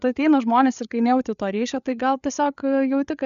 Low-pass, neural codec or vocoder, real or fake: 7.2 kHz; codec, 16 kHz, 8 kbps, FreqCodec, larger model; fake